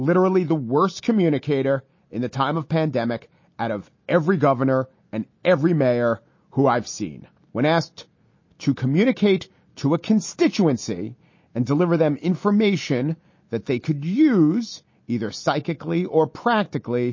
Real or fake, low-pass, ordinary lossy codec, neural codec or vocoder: real; 7.2 kHz; MP3, 32 kbps; none